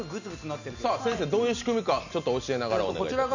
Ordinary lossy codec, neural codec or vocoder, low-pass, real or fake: none; none; 7.2 kHz; real